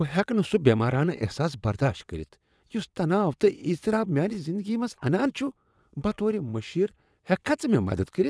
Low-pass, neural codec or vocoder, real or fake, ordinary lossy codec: none; vocoder, 22.05 kHz, 80 mel bands, WaveNeXt; fake; none